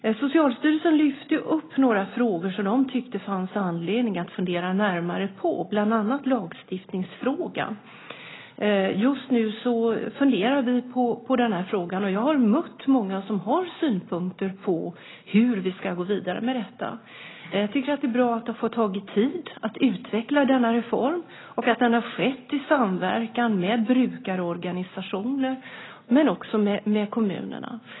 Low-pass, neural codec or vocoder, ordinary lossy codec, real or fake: 7.2 kHz; none; AAC, 16 kbps; real